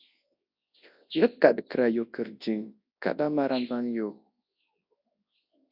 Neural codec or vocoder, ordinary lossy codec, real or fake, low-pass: codec, 24 kHz, 0.9 kbps, WavTokenizer, large speech release; AAC, 48 kbps; fake; 5.4 kHz